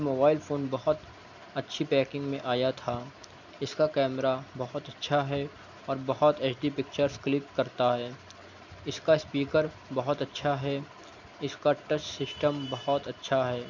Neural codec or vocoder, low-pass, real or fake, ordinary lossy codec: none; 7.2 kHz; real; none